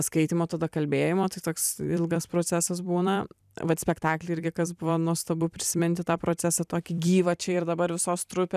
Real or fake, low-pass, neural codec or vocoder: fake; 14.4 kHz; vocoder, 44.1 kHz, 128 mel bands every 256 samples, BigVGAN v2